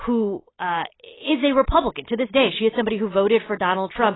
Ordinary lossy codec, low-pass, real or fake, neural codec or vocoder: AAC, 16 kbps; 7.2 kHz; real; none